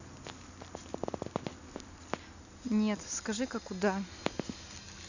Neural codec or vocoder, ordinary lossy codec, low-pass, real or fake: none; none; 7.2 kHz; real